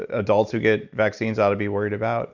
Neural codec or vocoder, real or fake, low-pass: none; real; 7.2 kHz